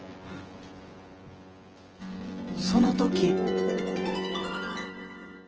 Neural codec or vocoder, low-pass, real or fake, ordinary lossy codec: vocoder, 24 kHz, 100 mel bands, Vocos; 7.2 kHz; fake; Opus, 16 kbps